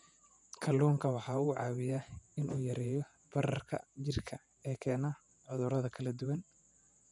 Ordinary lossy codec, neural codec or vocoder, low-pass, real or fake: none; vocoder, 48 kHz, 128 mel bands, Vocos; 10.8 kHz; fake